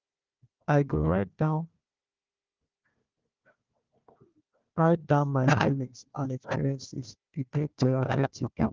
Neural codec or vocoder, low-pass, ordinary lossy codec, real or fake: codec, 16 kHz, 1 kbps, FunCodec, trained on Chinese and English, 50 frames a second; 7.2 kHz; Opus, 32 kbps; fake